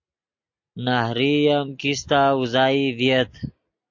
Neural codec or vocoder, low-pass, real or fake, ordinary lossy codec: none; 7.2 kHz; real; AAC, 48 kbps